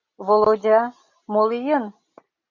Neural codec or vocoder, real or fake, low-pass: none; real; 7.2 kHz